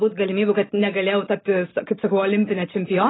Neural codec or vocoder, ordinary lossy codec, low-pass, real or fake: none; AAC, 16 kbps; 7.2 kHz; real